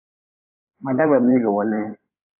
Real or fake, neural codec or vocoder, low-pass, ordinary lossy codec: fake; codec, 16 kHz in and 24 kHz out, 2.2 kbps, FireRedTTS-2 codec; 3.6 kHz; AAC, 32 kbps